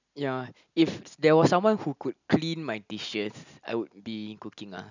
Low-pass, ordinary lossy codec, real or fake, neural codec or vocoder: 7.2 kHz; none; real; none